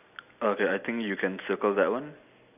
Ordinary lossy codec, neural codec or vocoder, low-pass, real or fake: none; none; 3.6 kHz; real